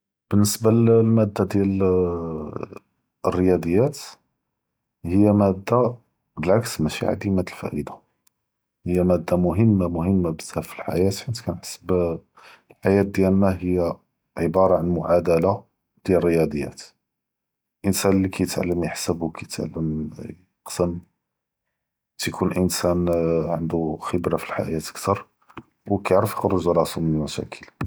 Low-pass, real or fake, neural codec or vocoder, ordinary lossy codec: none; real; none; none